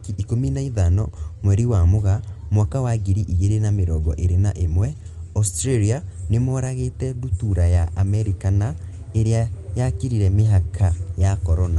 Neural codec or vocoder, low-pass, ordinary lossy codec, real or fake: none; 10.8 kHz; Opus, 24 kbps; real